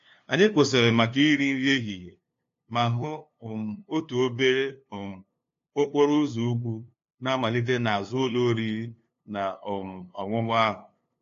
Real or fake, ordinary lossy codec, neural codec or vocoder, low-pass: fake; AAC, 48 kbps; codec, 16 kHz, 2 kbps, FunCodec, trained on LibriTTS, 25 frames a second; 7.2 kHz